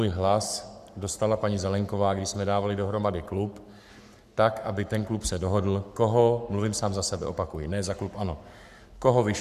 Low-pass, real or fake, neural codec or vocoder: 14.4 kHz; fake; codec, 44.1 kHz, 7.8 kbps, Pupu-Codec